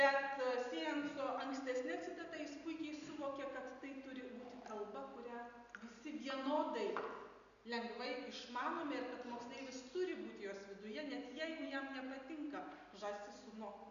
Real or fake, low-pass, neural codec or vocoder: real; 7.2 kHz; none